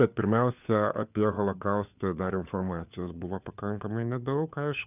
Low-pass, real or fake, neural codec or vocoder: 3.6 kHz; fake; codec, 16 kHz, 4 kbps, FunCodec, trained on LibriTTS, 50 frames a second